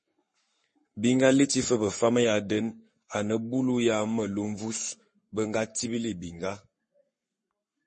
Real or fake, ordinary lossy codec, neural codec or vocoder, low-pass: fake; MP3, 32 kbps; codec, 44.1 kHz, 7.8 kbps, Pupu-Codec; 10.8 kHz